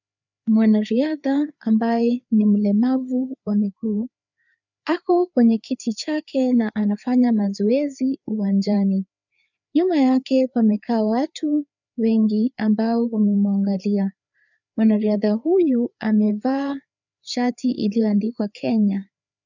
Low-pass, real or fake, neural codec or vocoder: 7.2 kHz; fake; codec, 16 kHz, 4 kbps, FreqCodec, larger model